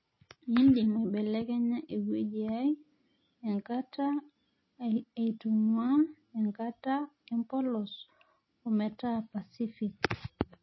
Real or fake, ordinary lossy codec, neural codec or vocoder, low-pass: real; MP3, 24 kbps; none; 7.2 kHz